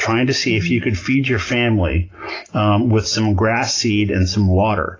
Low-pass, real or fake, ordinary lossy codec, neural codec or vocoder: 7.2 kHz; real; AAC, 32 kbps; none